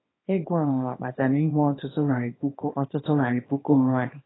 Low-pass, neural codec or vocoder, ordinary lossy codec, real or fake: 7.2 kHz; codec, 24 kHz, 0.9 kbps, WavTokenizer, small release; AAC, 16 kbps; fake